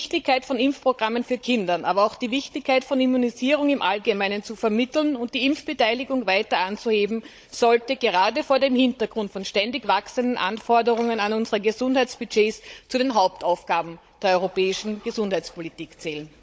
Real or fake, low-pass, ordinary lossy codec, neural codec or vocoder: fake; none; none; codec, 16 kHz, 16 kbps, FunCodec, trained on Chinese and English, 50 frames a second